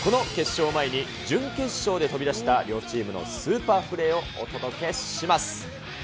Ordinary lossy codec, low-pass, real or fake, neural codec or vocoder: none; none; real; none